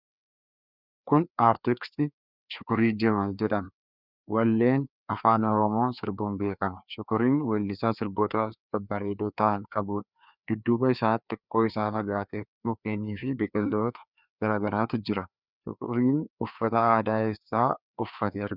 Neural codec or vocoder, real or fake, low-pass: codec, 16 kHz, 2 kbps, FreqCodec, larger model; fake; 5.4 kHz